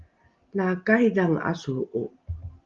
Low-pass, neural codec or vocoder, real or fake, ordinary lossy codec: 7.2 kHz; none; real; Opus, 32 kbps